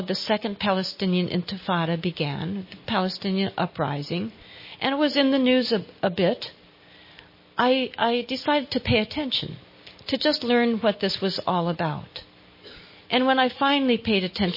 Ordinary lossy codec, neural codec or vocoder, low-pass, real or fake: MP3, 24 kbps; none; 5.4 kHz; real